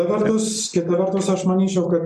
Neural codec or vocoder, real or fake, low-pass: none; real; 14.4 kHz